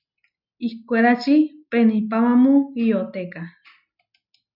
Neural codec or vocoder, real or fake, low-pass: none; real; 5.4 kHz